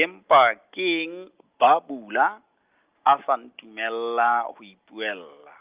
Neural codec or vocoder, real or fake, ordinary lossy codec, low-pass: none; real; Opus, 32 kbps; 3.6 kHz